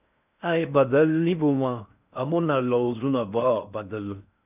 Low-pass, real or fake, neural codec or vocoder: 3.6 kHz; fake; codec, 16 kHz in and 24 kHz out, 0.6 kbps, FocalCodec, streaming, 4096 codes